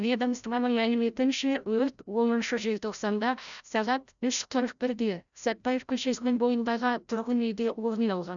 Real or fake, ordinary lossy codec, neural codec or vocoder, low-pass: fake; none; codec, 16 kHz, 0.5 kbps, FreqCodec, larger model; 7.2 kHz